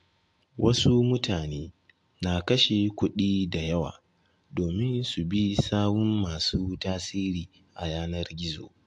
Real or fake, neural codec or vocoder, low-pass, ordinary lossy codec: real; none; 10.8 kHz; AAC, 64 kbps